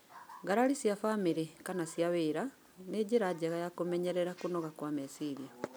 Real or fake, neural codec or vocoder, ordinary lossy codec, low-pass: real; none; none; none